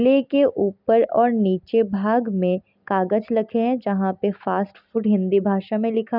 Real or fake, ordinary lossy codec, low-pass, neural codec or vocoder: real; none; 5.4 kHz; none